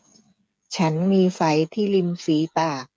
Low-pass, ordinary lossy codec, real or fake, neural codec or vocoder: none; none; fake; codec, 16 kHz, 8 kbps, FreqCodec, smaller model